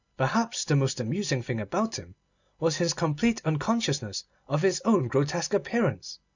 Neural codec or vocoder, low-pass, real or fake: none; 7.2 kHz; real